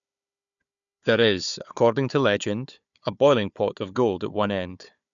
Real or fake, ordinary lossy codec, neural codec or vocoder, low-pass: fake; none; codec, 16 kHz, 4 kbps, FunCodec, trained on Chinese and English, 50 frames a second; 7.2 kHz